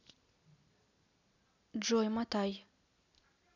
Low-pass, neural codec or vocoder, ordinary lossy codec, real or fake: 7.2 kHz; none; none; real